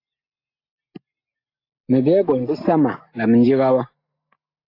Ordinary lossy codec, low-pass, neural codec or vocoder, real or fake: AAC, 32 kbps; 5.4 kHz; none; real